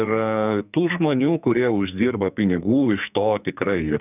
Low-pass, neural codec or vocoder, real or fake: 3.6 kHz; codec, 44.1 kHz, 2.6 kbps, SNAC; fake